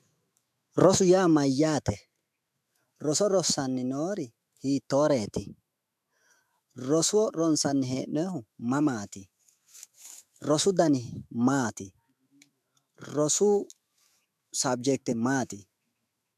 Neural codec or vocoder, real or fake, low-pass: autoencoder, 48 kHz, 128 numbers a frame, DAC-VAE, trained on Japanese speech; fake; 14.4 kHz